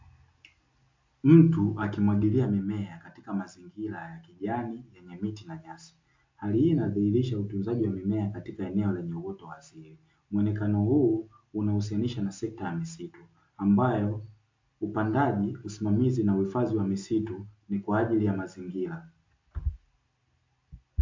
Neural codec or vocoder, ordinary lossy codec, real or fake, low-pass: none; MP3, 48 kbps; real; 7.2 kHz